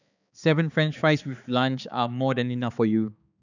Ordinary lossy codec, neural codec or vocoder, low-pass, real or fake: none; codec, 16 kHz, 4 kbps, X-Codec, HuBERT features, trained on balanced general audio; 7.2 kHz; fake